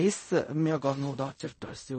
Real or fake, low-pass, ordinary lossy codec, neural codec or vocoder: fake; 10.8 kHz; MP3, 32 kbps; codec, 16 kHz in and 24 kHz out, 0.4 kbps, LongCat-Audio-Codec, fine tuned four codebook decoder